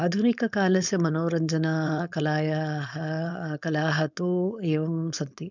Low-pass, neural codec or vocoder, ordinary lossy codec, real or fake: 7.2 kHz; codec, 16 kHz, 4.8 kbps, FACodec; none; fake